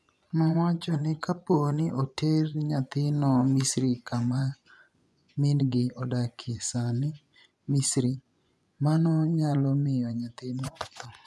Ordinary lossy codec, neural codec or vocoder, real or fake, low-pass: none; vocoder, 24 kHz, 100 mel bands, Vocos; fake; none